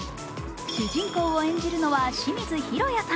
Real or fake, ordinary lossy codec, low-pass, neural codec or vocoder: real; none; none; none